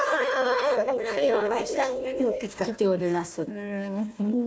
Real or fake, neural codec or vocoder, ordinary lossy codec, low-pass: fake; codec, 16 kHz, 1 kbps, FunCodec, trained on Chinese and English, 50 frames a second; none; none